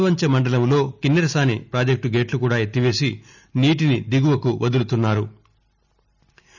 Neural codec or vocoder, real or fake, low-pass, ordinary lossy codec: none; real; 7.2 kHz; none